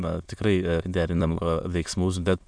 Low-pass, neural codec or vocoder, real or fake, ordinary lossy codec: 9.9 kHz; autoencoder, 22.05 kHz, a latent of 192 numbers a frame, VITS, trained on many speakers; fake; MP3, 96 kbps